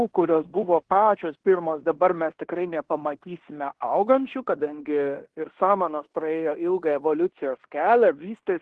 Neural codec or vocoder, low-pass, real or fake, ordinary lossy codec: codec, 16 kHz in and 24 kHz out, 0.9 kbps, LongCat-Audio-Codec, fine tuned four codebook decoder; 10.8 kHz; fake; Opus, 16 kbps